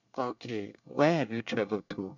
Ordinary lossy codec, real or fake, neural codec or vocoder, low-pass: none; fake; codec, 24 kHz, 1 kbps, SNAC; 7.2 kHz